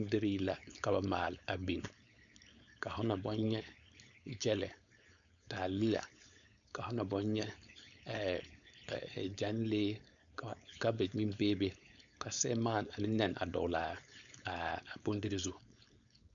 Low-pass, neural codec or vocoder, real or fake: 7.2 kHz; codec, 16 kHz, 4.8 kbps, FACodec; fake